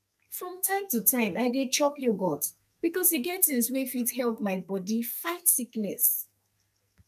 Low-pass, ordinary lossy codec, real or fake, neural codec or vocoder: 14.4 kHz; none; fake; codec, 44.1 kHz, 2.6 kbps, SNAC